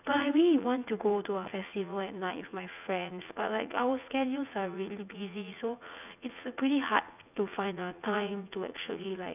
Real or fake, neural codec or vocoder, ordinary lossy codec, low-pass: fake; vocoder, 44.1 kHz, 80 mel bands, Vocos; none; 3.6 kHz